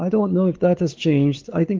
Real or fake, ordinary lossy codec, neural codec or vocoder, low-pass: fake; Opus, 16 kbps; codec, 16 kHz, 4 kbps, X-Codec, WavLM features, trained on Multilingual LibriSpeech; 7.2 kHz